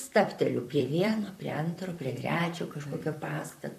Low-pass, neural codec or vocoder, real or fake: 14.4 kHz; vocoder, 44.1 kHz, 128 mel bands, Pupu-Vocoder; fake